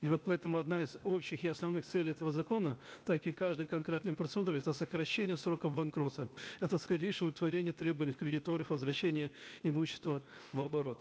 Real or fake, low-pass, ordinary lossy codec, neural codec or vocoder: fake; none; none; codec, 16 kHz, 0.8 kbps, ZipCodec